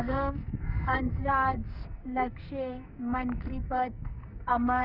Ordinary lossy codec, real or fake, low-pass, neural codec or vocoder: none; fake; 5.4 kHz; codec, 44.1 kHz, 2.6 kbps, SNAC